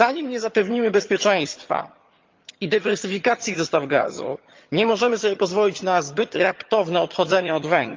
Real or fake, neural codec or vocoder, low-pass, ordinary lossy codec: fake; vocoder, 22.05 kHz, 80 mel bands, HiFi-GAN; 7.2 kHz; Opus, 24 kbps